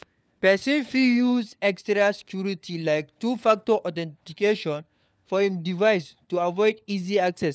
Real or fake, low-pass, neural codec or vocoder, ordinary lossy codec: fake; none; codec, 16 kHz, 4 kbps, FunCodec, trained on LibriTTS, 50 frames a second; none